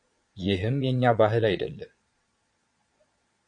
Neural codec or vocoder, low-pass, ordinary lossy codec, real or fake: vocoder, 22.05 kHz, 80 mel bands, Vocos; 9.9 kHz; MP3, 64 kbps; fake